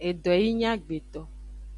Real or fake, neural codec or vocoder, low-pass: real; none; 10.8 kHz